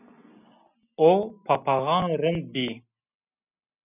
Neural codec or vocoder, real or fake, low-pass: none; real; 3.6 kHz